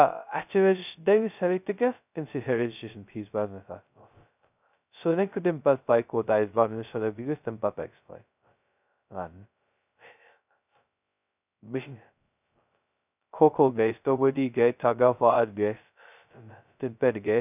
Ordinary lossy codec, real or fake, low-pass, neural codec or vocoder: none; fake; 3.6 kHz; codec, 16 kHz, 0.2 kbps, FocalCodec